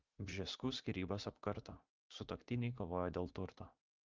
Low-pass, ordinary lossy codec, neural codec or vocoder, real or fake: 7.2 kHz; Opus, 32 kbps; vocoder, 44.1 kHz, 80 mel bands, Vocos; fake